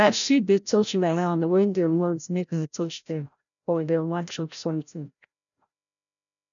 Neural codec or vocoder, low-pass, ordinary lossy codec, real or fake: codec, 16 kHz, 0.5 kbps, FreqCodec, larger model; 7.2 kHz; none; fake